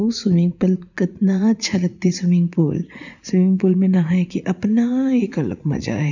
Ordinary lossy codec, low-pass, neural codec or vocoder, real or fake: none; 7.2 kHz; vocoder, 44.1 kHz, 80 mel bands, Vocos; fake